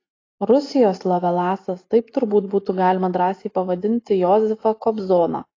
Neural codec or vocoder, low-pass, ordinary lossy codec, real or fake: none; 7.2 kHz; AAC, 32 kbps; real